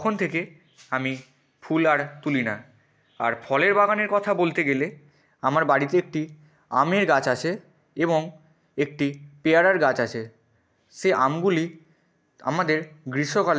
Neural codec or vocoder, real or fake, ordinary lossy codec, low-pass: none; real; none; none